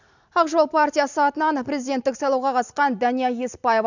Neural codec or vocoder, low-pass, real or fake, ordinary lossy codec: none; 7.2 kHz; real; none